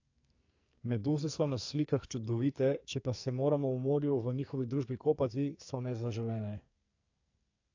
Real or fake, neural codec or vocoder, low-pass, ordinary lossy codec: fake; codec, 44.1 kHz, 2.6 kbps, SNAC; 7.2 kHz; AAC, 48 kbps